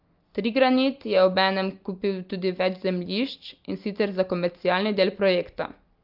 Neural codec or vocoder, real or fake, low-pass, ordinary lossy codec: none; real; 5.4 kHz; Opus, 32 kbps